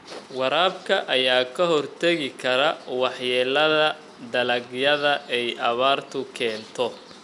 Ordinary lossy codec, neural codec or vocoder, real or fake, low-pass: none; none; real; 10.8 kHz